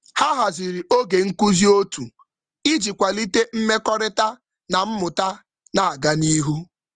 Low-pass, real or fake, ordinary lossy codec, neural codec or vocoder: 9.9 kHz; real; Opus, 24 kbps; none